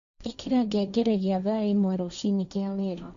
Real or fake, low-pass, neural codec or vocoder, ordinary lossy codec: fake; 7.2 kHz; codec, 16 kHz, 1.1 kbps, Voila-Tokenizer; none